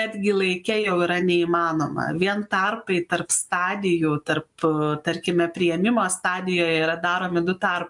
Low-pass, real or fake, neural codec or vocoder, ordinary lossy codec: 10.8 kHz; real; none; MP3, 64 kbps